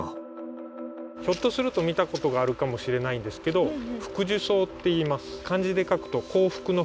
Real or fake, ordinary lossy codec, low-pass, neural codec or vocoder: real; none; none; none